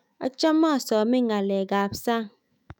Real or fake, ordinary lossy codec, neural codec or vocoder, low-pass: fake; none; autoencoder, 48 kHz, 128 numbers a frame, DAC-VAE, trained on Japanese speech; 19.8 kHz